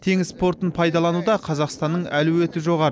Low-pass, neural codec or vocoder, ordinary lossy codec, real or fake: none; none; none; real